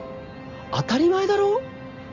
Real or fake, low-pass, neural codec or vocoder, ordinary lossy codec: real; 7.2 kHz; none; none